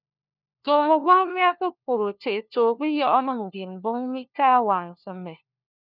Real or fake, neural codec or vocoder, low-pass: fake; codec, 16 kHz, 1 kbps, FunCodec, trained on LibriTTS, 50 frames a second; 5.4 kHz